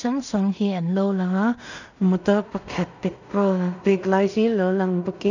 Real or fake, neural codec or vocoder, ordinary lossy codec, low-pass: fake; codec, 16 kHz in and 24 kHz out, 0.4 kbps, LongCat-Audio-Codec, two codebook decoder; none; 7.2 kHz